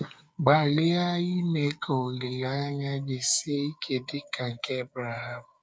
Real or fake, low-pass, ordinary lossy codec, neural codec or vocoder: fake; none; none; codec, 16 kHz, 8 kbps, FreqCodec, smaller model